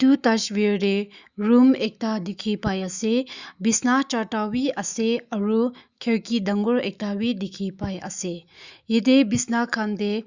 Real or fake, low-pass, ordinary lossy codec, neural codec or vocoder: fake; 7.2 kHz; Opus, 64 kbps; autoencoder, 48 kHz, 128 numbers a frame, DAC-VAE, trained on Japanese speech